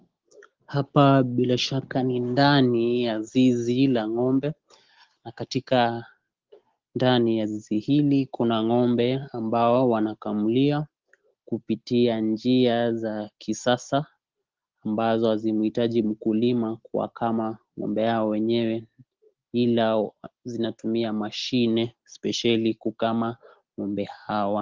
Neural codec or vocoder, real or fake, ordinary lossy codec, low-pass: none; real; Opus, 16 kbps; 7.2 kHz